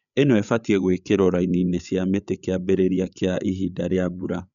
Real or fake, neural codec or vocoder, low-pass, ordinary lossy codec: fake; codec, 16 kHz, 8 kbps, FreqCodec, larger model; 7.2 kHz; none